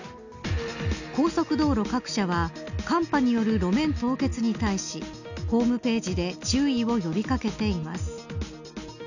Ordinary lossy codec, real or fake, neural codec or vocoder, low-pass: none; real; none; 7.2 kHz